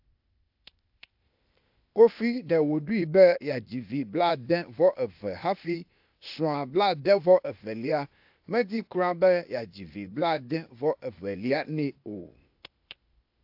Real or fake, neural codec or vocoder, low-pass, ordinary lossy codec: fake; codec, 16 kHz, 0.8 kbps, ZipCodec; 5.4 kHz; AAC, 48 kbps